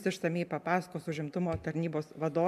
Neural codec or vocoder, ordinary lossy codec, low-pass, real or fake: none; MP3, 96 kbps; 14.4 kHz; real